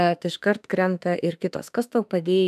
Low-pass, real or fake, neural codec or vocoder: 14.4 kHz; fake; autoencoder, 48 kHz, 32 numbers a frame, DAC-VAE, trained on Japanese speech